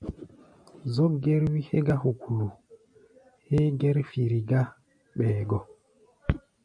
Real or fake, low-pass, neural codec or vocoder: real; 9.9 kHz; none